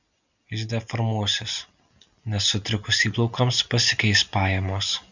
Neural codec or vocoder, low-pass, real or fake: none; 7.2 kHz; real